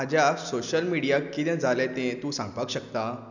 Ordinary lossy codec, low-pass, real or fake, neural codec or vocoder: none; 7.2 kHz; real; none